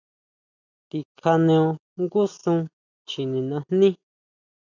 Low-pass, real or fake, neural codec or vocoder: 7.2 kHz; real; none